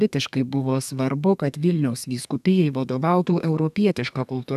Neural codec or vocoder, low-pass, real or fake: codec, 32 kHz, 1.9 kbps, SNAC; 14.4 kHz; fake